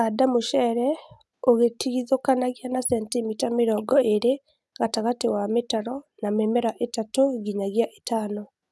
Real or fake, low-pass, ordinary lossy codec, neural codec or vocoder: real; none; none; none